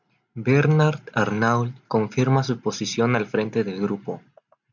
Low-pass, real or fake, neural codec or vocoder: 7.2 kHz; real; none